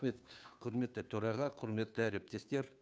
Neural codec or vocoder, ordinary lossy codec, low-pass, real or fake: codec, 16 kHz, 2 kbps, FunCodec, trained on Chinese and English, 25 frames a second; none; none; fake